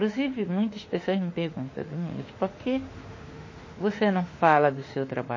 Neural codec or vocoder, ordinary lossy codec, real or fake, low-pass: autoencoder, 48 kHz, 32 numbers a frame, DAC-VAE, trained on Japanese speech; MP3, 32 kbps; fake; 7.2 kHz